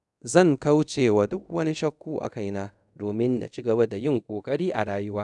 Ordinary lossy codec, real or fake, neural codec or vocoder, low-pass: none; fake; codec, 24 kHz, 0.5 kbps, DualCodec; 10.8 kHz